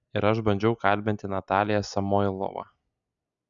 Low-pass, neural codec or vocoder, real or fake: 7.2 kHz; none; real